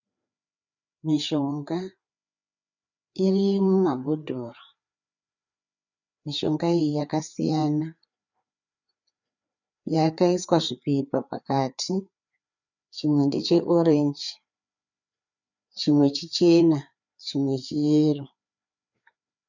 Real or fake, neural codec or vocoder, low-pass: fake; codec, 16 kHz, 4 kbps, FreqCodec, larger model; 7.2 kHz